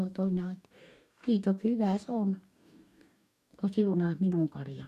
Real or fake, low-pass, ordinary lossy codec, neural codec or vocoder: fake; 14.4 kHz; none; codec, 44.1 kHz, 2.6 kbps, DAC